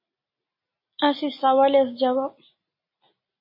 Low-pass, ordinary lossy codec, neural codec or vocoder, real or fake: 5.4 kHz; MP3, 24 kbps; none; real